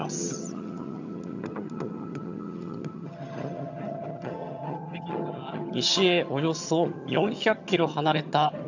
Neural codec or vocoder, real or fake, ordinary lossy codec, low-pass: vocoder, 22.05 kHz, 80 mel bands, HiFi-GAN; fake; none; 7.2 kHz